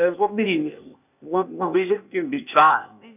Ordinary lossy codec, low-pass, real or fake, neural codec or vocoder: none; 3.6 kHz; fake; codec, 16 kHz, 0.8 kbps, ZipCodec